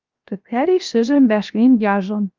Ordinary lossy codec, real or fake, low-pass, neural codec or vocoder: Opus, 24 kbps; fake; 7.2 kHz; codec, 16 kHz, 0.7 kbps, FocalCodec